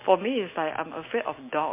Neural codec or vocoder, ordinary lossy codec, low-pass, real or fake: none; MP3, 24 kbps; 3.6 kHz; real